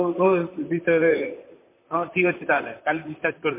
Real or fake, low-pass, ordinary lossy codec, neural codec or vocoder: fake; 3.6 kHz; MP3, 24 kbps; vocoder, 44.1 kHz, 128 mel bands, Pupu-Vocoder